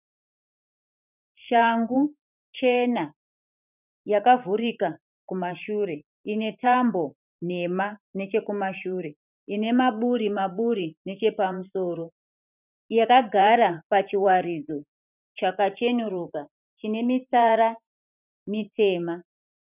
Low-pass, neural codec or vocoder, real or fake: 3.6 kHz; vocoder, 44.1 kHz, 128 mel bands every 512 samples, BigVGAN v2; fake